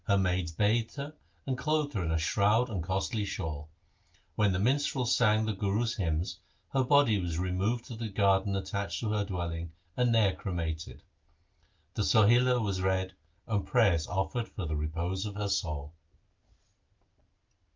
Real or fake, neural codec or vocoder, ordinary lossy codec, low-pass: real; none; Opus, 24 kbps; 7.2 kHz